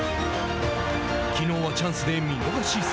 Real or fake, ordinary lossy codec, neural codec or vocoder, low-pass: real; none; none; none